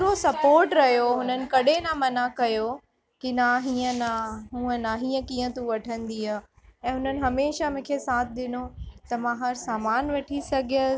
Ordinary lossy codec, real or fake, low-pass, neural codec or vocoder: none; real; none; none